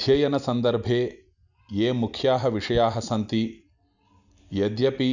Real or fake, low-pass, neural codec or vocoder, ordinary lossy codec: real; 7.2 kHz; none; none